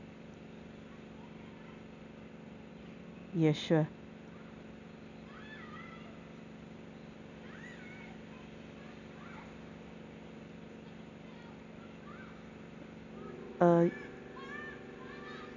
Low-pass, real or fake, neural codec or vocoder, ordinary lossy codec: 7.2 kHz; real; none; none